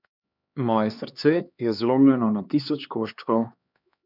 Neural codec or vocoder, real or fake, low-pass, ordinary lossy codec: codec, 16 kHz, 2 kbps, X-Codec, HuBERT features, trained on LibriSpeech; fake; 5.4 kHz; none